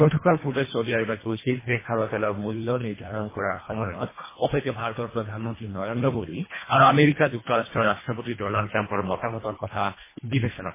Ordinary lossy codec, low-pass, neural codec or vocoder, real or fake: MP3, 16 kbps; 3.6 kHz; codec, 24 kHz, 1.5 kbps, HILCodec; fake